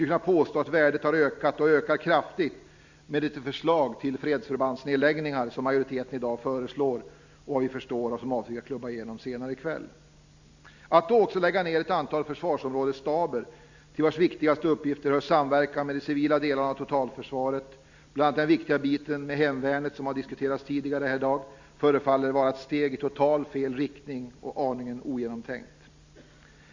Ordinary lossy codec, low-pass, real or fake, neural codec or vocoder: Opus, 64 kbps; 7.2 kHz; real; none